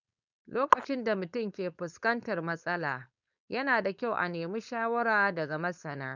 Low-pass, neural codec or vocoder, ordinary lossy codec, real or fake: 7.2 kHz; codec, 16 kHz, 4.8 kbps, FACodec; none; fake